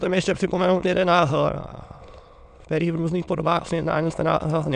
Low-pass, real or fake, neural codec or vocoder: 9.9 kHz; fake; autoencoder, 22.05 kHz, a latent of 192 numbers a frame, VITS, trained on many speakers